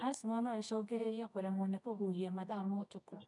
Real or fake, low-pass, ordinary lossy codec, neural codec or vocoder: fake; 10.8 kHz; none; codec, 24 kHz, 0.9 kbps, WavTokenizer, medium music audio release